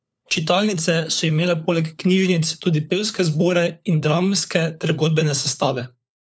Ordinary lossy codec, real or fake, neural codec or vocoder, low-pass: none; fake; codec, 16 kHz, 4 kbps, FunCodec, trained on LibriTTS, 50 frames a second; none